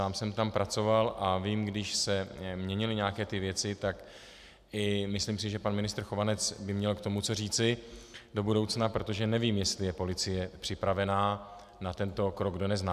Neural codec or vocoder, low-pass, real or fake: none; 14.4 kHz; real